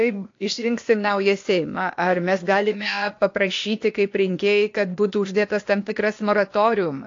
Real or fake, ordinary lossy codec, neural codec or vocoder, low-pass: fake; AAC, 48 kbps; codec, 16 kHz, 0.8 kbps, ZipCodec; 7.2 kHz